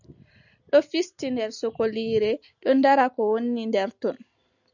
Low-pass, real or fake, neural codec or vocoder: 7.2 kHz; real; none